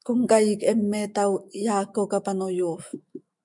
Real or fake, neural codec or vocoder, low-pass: fake; autoencoder, 48 kHz, 128 numbers a frame, DAC-VAE, trained on Japanese speech; 10.8 kHz